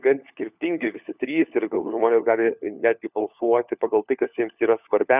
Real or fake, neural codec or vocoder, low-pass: fake; codec, 16 kHz, 4 kbps, FunCodec, trained on LibriTTS, 50 frames a second; 3.6 kHz